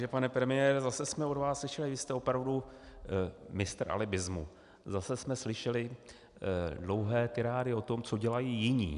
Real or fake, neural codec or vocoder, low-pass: real; none; 10.8 kHz